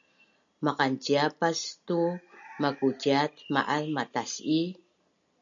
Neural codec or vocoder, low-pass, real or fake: none; 7.2 kHz; real